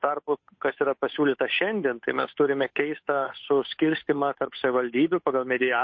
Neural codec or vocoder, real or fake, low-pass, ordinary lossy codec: codec, 16 kHz, 8 kbps, FunCodec, trained on Chinese and English, 25 frames a second; fake; 7.2 kHz; MP3, 32 kbps